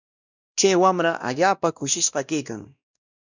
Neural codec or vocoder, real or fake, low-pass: codec, 16 kHz, 1 kbps, X-Codec, WavLM features, trained on Multilingual LibriSpeech; fake; 7.2 kHz